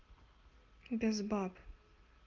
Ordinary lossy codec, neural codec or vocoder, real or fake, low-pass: Opus, 32 kbps; none; real; 7.2 kHz